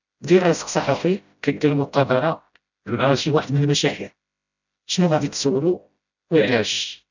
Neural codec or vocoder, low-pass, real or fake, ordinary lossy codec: codec, 16 kHz, 0.5 kbps, FreqCodec, smaller model; 7.2 kHz; fake; none